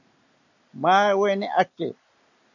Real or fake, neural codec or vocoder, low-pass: real; none; 7.2 kHz